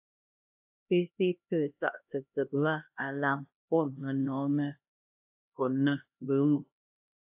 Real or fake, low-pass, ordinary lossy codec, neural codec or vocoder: fake; 3.6 kHz; AAC, 32 kbps; codec, 16 kHz, 1 kbps, X-Codec, HuBERT features, trained on LibriSpeech